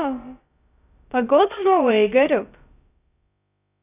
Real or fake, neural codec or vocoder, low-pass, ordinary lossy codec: fake; codec, 16 kHz, about 1 kbps, DyCAST, with the encoder's durations; 3.6 kHz; none